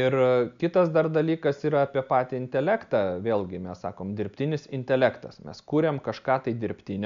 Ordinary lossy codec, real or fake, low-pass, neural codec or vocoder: MP3, 64 kbps; real; 7.2 kHz; none